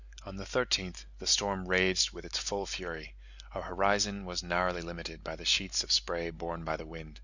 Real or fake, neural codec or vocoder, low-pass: real; none; 7.2 kHz